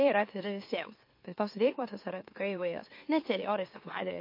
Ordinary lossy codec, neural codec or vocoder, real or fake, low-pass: MP3, 32 kbps; autoencoder, 44.1 kHz, a latent of 192 numbers a frame, MeloTTS; fake; 5.4 kHz